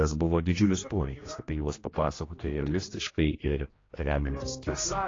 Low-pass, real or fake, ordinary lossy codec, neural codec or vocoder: 7.2 kHz; fake; AAC, 32 kbps; codec, 16 kHz, 1 kbps, X-Codec, HuBERT features, trained on general audio